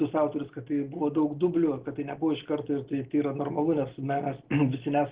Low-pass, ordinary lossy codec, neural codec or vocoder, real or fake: 3.6 kHz; Opus, 16 kbps; none; real